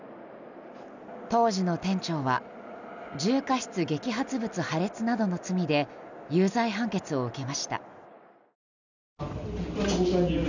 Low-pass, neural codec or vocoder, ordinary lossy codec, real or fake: 7.2 kHz; none; none; real